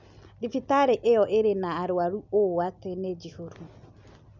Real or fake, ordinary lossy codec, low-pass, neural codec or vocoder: real; none; 7.2 kHz; none